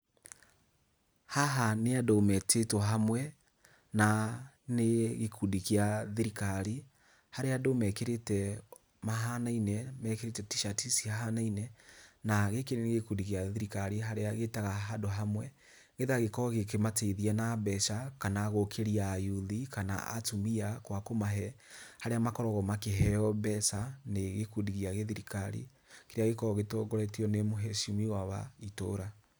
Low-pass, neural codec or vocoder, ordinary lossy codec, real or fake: none; none; none; real